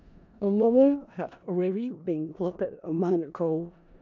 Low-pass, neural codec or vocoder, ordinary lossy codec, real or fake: 7.2 kHz; codec, 16 kHz in and 24 kHz out, 0.4 kbps, LongCat-Audio-Codec, four codebook decoder; none; fake